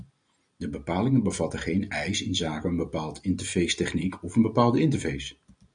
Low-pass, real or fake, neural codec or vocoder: 9.9 kHz; real; none